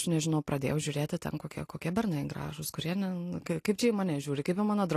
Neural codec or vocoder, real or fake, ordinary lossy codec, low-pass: none; real; AAC, 48 kbps; 14.4 kHz